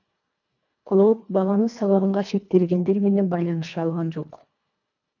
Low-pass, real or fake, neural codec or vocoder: 7.2 kHz; fake; codec, 24 kHz, 1.5 kbps, HILCodec